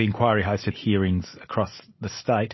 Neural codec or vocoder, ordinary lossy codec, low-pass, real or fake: none; MP3, 24 kbps; 7.2 kHz; real